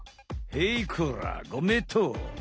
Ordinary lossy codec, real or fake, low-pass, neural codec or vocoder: none; real; none; none